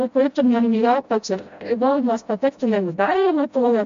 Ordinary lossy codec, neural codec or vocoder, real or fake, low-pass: MP3, 48 kbps; codec, 16 kHz, 0.5 kbps, FreqCodec, smaller model; fake; 7.2 kHz